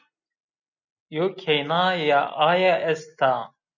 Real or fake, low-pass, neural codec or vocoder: real; 7.2 kHz; none